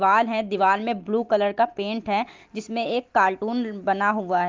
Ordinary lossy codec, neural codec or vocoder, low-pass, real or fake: Opus, 32 kbps; none; 7.2 kHz; real